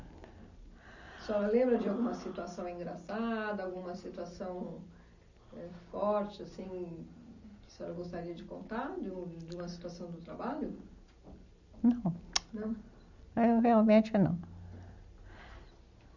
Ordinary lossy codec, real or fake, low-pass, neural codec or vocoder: none; real; 7.2 kHz; none